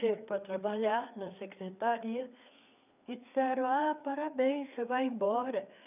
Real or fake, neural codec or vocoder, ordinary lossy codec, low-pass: fake; codec, 16 kHz, 4 kbps, FreqCodec, larger model; none; 3.6 kHz